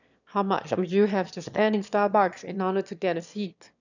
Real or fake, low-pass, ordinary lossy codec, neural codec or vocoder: fake; 7.2 kHz; none; autoencoder, 22.05 kHz, a latent of 192 numbers a frame, VITS, trained on one speaker